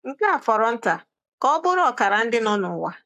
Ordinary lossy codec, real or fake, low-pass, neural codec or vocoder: AAC, 96 kbps; fake; 14.4 kHz; codec, 44.1 kHz, 7.8 kbps, Pupu-Codec